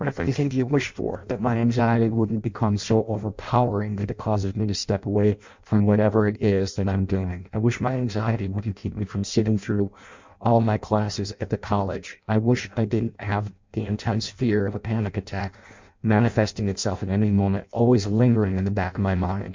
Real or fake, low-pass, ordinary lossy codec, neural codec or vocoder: fake; 7.2 kHz; MP3, 64 kbps; codec, 16 kHz in and 24 kHz out, 0.6 kbps, FireRedTTS-2 codec